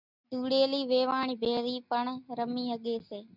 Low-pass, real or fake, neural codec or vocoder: 5.4 kHz; real; none